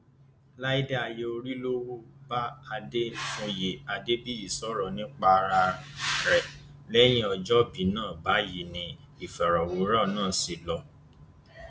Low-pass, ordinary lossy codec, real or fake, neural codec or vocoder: none; none; real; none